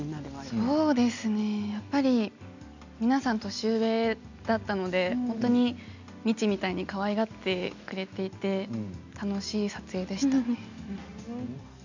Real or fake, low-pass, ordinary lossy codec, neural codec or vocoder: real; 7.2 kHz; none; none